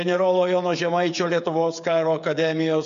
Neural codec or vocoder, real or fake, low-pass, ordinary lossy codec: codec, 16 kHz, 8 kbps, FreqCodec, smaller model; fake; 7.2 kHz; MP3, 64 kbps